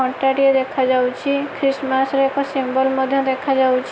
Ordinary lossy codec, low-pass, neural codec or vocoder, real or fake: none; none; none; real